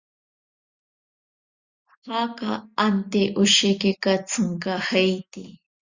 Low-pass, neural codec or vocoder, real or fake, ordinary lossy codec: 7.2 kHz; none; real; Opus, 64 kbps